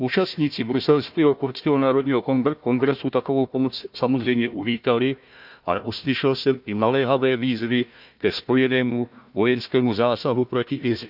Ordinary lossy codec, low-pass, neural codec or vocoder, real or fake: none; 5.4 kHz; codec, 16 kHz, 1 kbps, FunCodec, trained on Chinese and English, 50 frames a second; fake